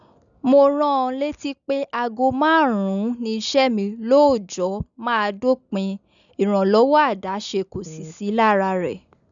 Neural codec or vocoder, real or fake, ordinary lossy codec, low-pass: none; real; none; 7.2 kHz